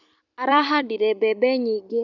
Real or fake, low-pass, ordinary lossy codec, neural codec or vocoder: fake; 7.2 kHz; none; vocoder, 44.1 kHz, 128 mel bands every 256 samples, BigVGAN v2